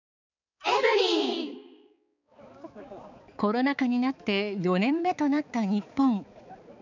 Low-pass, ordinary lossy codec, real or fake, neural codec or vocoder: 7.2 kHz; none; fake; codec, 16 kHz, 4 kbps, X-Codec, HuBERT features, trained on balanced general audio